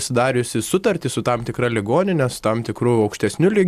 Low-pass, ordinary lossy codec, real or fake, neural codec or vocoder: 14.4 kHz; AAC, 96 kbps; real; none